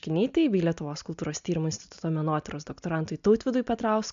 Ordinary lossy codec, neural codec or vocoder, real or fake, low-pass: MP3, 64 kbps; none; real; 7.2 kHz